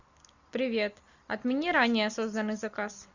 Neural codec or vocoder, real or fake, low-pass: none; real; 7.2 kHz